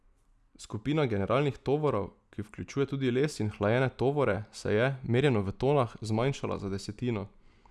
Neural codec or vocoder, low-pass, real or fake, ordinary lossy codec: none; none; real; none